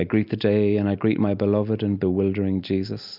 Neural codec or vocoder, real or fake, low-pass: none; real; 5.4 kHz